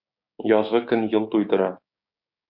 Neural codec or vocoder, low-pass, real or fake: codec, 16 kHz, 6 kbps, DAC; 5.4 kHz; fake